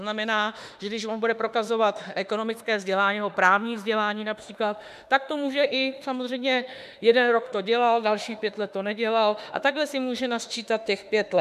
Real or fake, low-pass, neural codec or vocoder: fake; 14.4 kHz; autoencoder, 48 kHz, 32 numbers a frame, DAC-VAE, trained on Japanese speech